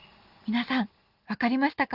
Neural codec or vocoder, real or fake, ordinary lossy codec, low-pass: none; real; Opus, 32 kbps; 5.4 kHz